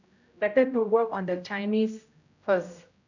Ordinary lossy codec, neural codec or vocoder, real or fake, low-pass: none; codec, 16 kHz, 0.5 kbps, X-Codec, HuBERT features, trained on general audio; fake; 7.2 kHz